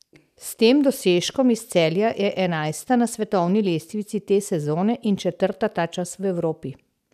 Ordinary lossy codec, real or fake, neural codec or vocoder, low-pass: none; real; none; 14.4 kHz